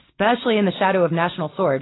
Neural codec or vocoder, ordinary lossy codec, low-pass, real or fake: none; AAC, 16 kbps; 7.2 kHz; real